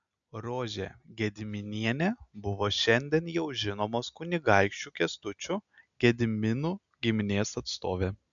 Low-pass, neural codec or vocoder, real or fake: 7.2 kHz; none; real